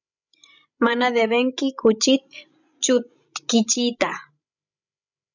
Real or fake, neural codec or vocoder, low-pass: fake; codec, 16 kHz, 16 kbps, FreqCodec, larger model; 7.2 kHz